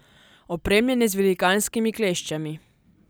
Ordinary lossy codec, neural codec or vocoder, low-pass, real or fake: none; none; none; real